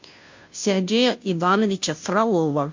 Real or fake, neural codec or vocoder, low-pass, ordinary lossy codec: fake; codec, 16 kHz, 0.5 kbps, FunCodec, trained on Chinese and English, 25 frames a second; 7.2 kHz; MP3, 48 kbps